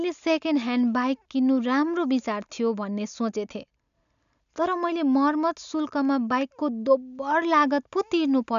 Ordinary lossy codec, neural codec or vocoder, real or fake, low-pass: none; none; real; 7.2 kHz